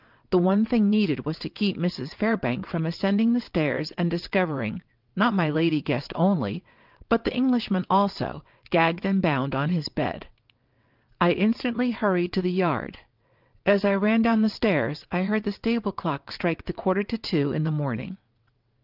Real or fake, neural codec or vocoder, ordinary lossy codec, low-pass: fake; vocoder, 22.05 kHz, 80 mel bands, WaveNeXt; Opus, 24 kbps; 5.4 kHz